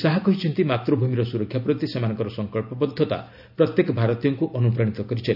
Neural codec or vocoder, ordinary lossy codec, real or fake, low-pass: none; none; real; 5.4 kHz